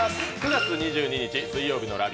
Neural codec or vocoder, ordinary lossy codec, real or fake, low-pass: none; none; real; none